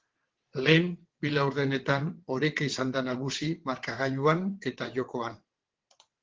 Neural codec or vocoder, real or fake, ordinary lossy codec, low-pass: vocoder, 22.05 kHz, 80 mel bands, WaveNeXt; fake; Opus, 16 kbps; 7.2 kHz